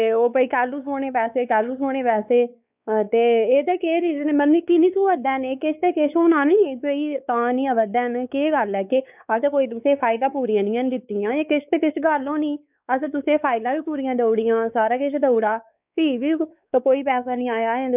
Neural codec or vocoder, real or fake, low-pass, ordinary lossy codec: codec, 16 kHz, 4 kbps, X-Codec, WavLM features, trained on Multilingual LibriSpeech; fake; 3.6 kHz; none